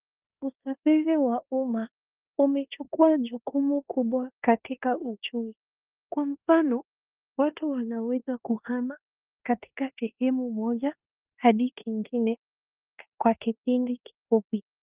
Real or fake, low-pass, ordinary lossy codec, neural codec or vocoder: fake; 3.6 kHz; Opus, 24 kbps; codec, 16 kHz in and 24 kHz out, 0.9 kbps, LongCat-Audio-Codec, four codebook decoder